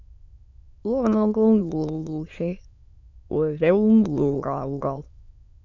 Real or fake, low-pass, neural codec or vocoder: fake; 7.2 kHz; autoencoder, 22.05 kHz, a latent of 192 numbers a frame, VITS, trained on many speakers